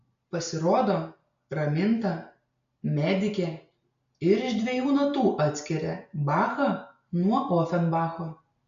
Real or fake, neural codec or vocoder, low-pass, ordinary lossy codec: real; none; 7.2 kHz; MP3, 64 kbps